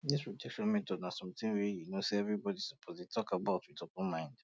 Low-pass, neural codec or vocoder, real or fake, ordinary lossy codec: none; none; real; none